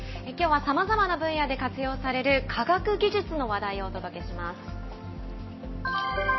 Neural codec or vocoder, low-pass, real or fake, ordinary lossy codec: none; 7.2 kHz; real; MP3, 24 kbps